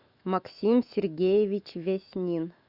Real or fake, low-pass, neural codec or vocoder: fake; 5.4 kHz; autoencoder, 48 kHz, 128 numbers a frame, DAC-VAE, trained on Japanese speech